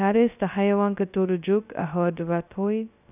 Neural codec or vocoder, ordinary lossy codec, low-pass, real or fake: codec, 16 kHz, 0.2 kbps, FocalCodec; none; 3.6 kHz; fake